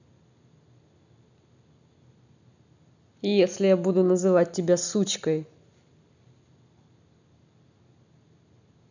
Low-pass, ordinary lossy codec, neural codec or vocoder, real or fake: 7.2 kHz; none; none; real